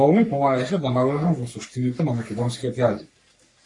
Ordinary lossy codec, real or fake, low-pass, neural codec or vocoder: AAC, 48 kbps; fake; 10.8 kHz; codec, 44.1 kHz, 3.4 kbps, Pupu-Codec